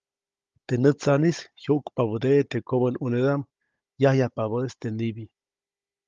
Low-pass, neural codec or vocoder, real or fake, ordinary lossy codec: 7.2 kHz; codec, 16 kHz, 16 kbps, FunCodec, trained on Chinese and English, 50 frames a second; fake; Opus, 32 kbps